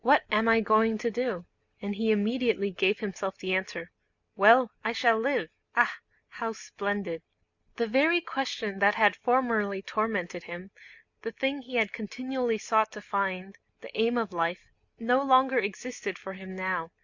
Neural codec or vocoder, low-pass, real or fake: none; 7.2 kHz; real